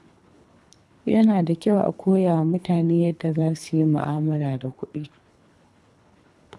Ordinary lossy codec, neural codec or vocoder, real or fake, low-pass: none; codec, 24 kHz, 3 kbps, HILCodec; fake; none